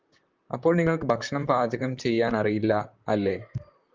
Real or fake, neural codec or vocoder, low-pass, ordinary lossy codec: fake; vocoder, 44.1 kHz, 128 mel bands, Pupu-Vocoder; 7.2 kHz; Opus, 24 kbps